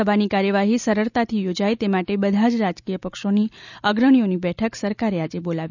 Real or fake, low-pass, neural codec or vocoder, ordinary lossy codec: real; 7.2 kHz; none; none